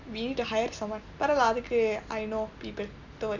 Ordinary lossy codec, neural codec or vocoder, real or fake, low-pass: none; none; real; 7.2 kHz